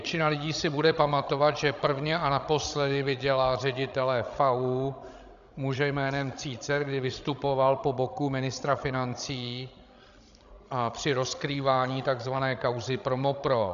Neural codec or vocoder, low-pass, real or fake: codec, 16 kHz, 16 kbps, FreqCodec, larger model; 7.2 kHz; fake